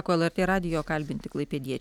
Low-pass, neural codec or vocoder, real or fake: 19.8 kHz; none; real